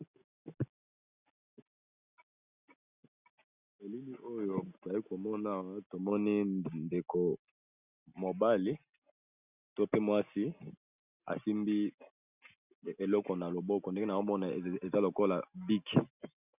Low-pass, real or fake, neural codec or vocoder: 3.6 kHz; real; none